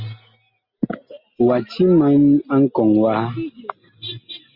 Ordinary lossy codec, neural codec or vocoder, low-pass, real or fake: Opus, 64 kbps; none; 5.4 kHz; real